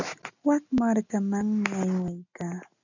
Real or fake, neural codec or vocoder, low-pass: real; none; 7.2 kHz